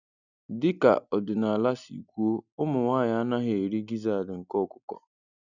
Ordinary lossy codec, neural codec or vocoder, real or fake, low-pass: none; none; real; 7.2 kHz